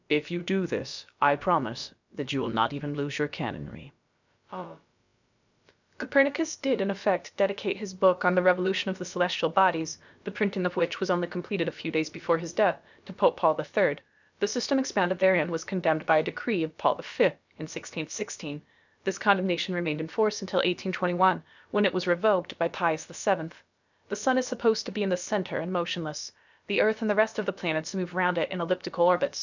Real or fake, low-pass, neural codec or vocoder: fake; 7.2 kHz; codec, 16 kHz, about 1 kbps, DyCAST, with the encoder's durations